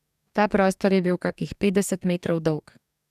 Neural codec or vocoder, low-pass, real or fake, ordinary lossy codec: codec, 44.1 kHz, 2.6 kbps, DAC; 14.4 kHz; fake; none